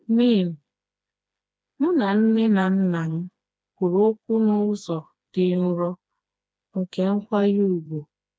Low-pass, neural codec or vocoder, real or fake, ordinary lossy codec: none; codec, 16 kHz, 2 kbps, FreqCodec, smaller model; fake; none